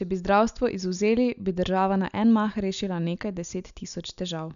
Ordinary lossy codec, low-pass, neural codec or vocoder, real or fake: none; 7.2 kHz; none; real